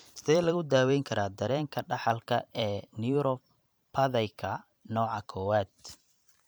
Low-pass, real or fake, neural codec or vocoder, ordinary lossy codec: none; fake; vocoder, 44.1 kHz, 128 mel bands every 512 samples, BigVGAN v2; none